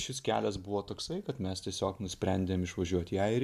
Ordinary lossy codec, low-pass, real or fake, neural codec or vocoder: MP3, 96 kbps; 14.4 kHz; real; none